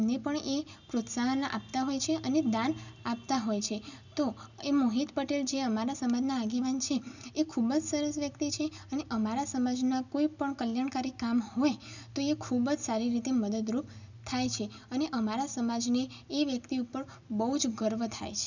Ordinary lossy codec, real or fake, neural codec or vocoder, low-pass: none; real; none; 7.2 kHz